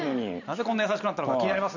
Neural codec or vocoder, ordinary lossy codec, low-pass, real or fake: vocoder, 44.1 kHz, 128 mel bands every 512 samples, BigVGAN v2; none; 7.2 kHz; fake